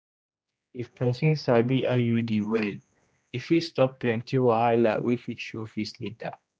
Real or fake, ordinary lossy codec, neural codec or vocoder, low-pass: fake; none; codec, 16 kHz, 1 kbps, X-Codec, HuBERT features, trained on general audio; none